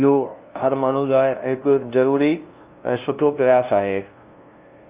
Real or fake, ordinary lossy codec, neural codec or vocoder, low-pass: fake; Opus, 24 kbps; codec, 16 kHz, 0.5 kbps, FunCodec, trained on LibriTTS, 25 frames a second; 3.6 kHz